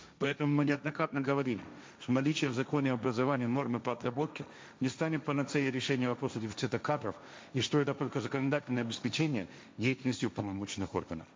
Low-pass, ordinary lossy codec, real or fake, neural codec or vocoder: none; none; fake; codec, 16 kHz, 1.1 kbps, Voila-Tokenizer